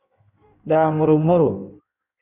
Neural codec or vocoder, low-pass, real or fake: codec, 16 kHz in and 24 kHz out, 1.1 kbps, FireRedTTS-2 codec; 3.6 kHz; fake